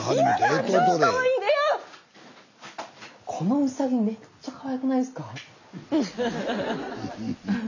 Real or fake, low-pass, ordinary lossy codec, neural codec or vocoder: real; 7.2 kHz; none; none